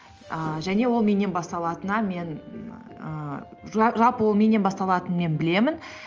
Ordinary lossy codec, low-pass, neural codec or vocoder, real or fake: Opus, 24 kbps; 7.2 kHz; none; real